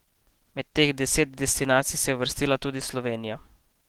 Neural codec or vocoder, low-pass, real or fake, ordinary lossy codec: autoencoder, 48 kHz, 128 numbers a frame, DAC-VAE, trained on Japanese speech; 19.8 kHz; fake; Opus, 16 kbps